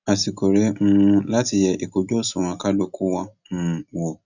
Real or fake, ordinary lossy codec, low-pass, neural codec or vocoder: real; none; 7.2 kHz; none